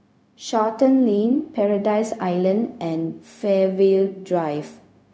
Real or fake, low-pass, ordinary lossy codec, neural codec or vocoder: fake; none; none; codec, 16 kHz, 0.4 kbps, LongCat-Audio-Codec